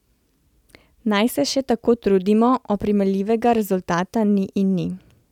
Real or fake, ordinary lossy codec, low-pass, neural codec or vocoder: real; none; 19.8 kHz; none